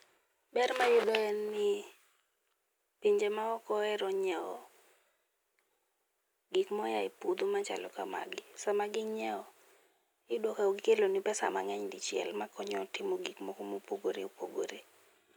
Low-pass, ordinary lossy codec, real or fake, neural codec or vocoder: none; none; real; none